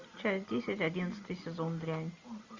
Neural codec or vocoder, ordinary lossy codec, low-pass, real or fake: none; MP3, 64 kbps; 7.2 kHz; real